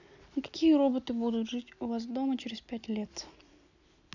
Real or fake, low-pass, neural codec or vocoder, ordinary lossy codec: real; 7.2 kHz; none; none